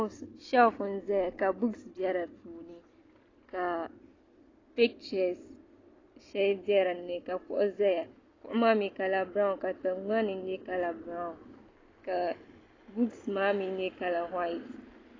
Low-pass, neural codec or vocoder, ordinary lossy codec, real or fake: 7.2 kHz; none; AAC, 32 kbps; real